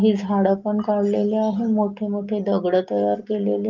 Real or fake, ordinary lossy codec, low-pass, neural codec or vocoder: real; Opus, 32 kbps; 7.2 kHz; none